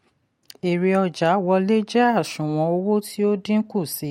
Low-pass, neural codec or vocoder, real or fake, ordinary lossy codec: 19.8 kHz; none; real; MP3, 64 kbps